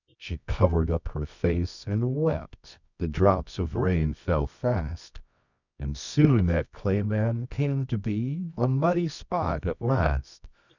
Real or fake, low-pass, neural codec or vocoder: fake; 7.2 kHz; codec, 24 kHz, 0.9 kbps, WavTokenizer, medium music audio release